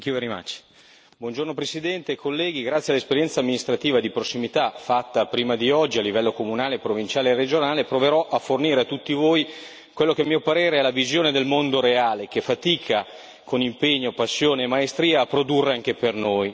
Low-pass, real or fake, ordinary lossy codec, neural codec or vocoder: none; real; none; none